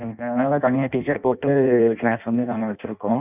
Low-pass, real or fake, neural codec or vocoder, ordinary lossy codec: 3.6 kHz; fake; codec, 16 kHz in and 24 kHz out, 0.6 kbps, FireRedTTS-2 codec; none